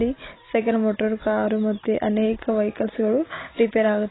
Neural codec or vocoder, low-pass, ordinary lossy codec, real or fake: none; 7.2 kHz; AAC, 16 kbps; real